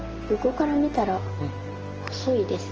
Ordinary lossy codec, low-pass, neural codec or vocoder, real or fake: Opus, 16 kbps; 7.2 kHz; none; real